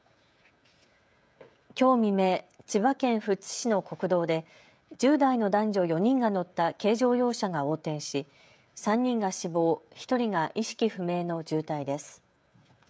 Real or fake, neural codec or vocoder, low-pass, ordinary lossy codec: fake; codec, 16 kHz, 16 kbps, FreqCodec, smaller model; none; none